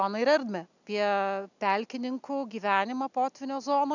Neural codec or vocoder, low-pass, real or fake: none; 7.2 kHz; real